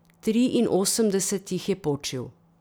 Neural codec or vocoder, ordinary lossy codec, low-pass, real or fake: none; none; none; real